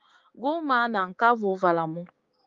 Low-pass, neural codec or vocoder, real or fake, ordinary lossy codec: 7.2 kHz; codec, 16 kHz, 6 kbps, DAC; fake; Opus, 32 kbps